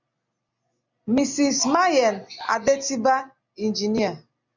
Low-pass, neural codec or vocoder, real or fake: 7.2 kHz; none; real